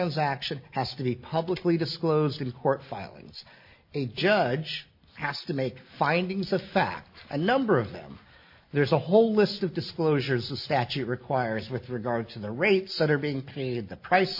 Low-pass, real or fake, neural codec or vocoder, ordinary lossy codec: 5.4 kHz; real; none; MP3, 32 kbps